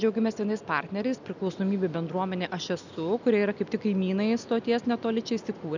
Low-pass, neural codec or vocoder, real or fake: 7.2 kHz; none; real